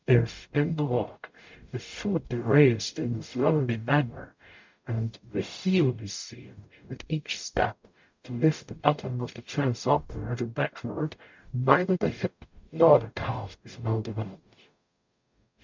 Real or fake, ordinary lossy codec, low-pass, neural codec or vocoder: fake; MP3, 64 kbps; 7.2 kHz; codec, 44.1 kHz, 0.9 kbps, DAC